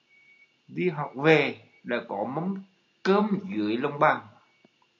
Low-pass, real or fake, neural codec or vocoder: 7.2 kHz; real; none